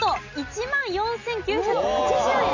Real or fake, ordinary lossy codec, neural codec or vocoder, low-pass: real; none; none; 7.2 kHz